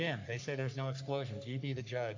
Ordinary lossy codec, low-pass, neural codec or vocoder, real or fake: AAC, 48 kbps; 7.2 kHz; codec, 44.1 kHz, 3.4 kbps, Pupu-Codec; fake